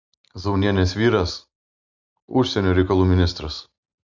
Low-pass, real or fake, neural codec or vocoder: 7.2 kHz; real; none